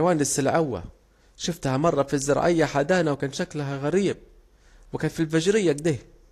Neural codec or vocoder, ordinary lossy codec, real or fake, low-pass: none; AAC, 48 kbps; real; 14.4 kHz